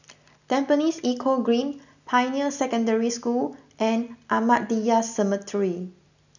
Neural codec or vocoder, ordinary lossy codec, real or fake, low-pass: none; none; real; 7.2 kHz